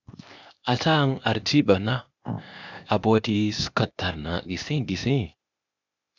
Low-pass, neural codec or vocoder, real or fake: 7.2 kHz; codec, 16 kHz, 0.8 kbps, ZipCodec; fake